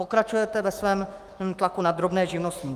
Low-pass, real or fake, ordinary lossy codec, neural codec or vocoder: 14.4 kHz; fake; Opus, 32 kbps; autoencoder, 48 kHz, 128 numbers a frame, DAC-VAE, trained on Japanese speech